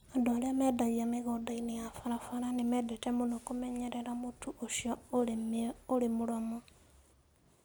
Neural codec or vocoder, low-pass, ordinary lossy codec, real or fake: none; none; none; real